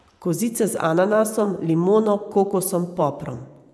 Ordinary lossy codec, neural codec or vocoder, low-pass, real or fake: none; vocoder, 24 kHz, 100 mel bands, Vocos; none; fake